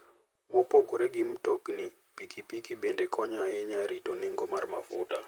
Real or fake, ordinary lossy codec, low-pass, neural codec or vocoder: fake; Opus, 32 kbps; 19.8 kHz; vocoder, 44.1 kHz, 128 mel bands, Pupu-Vocoder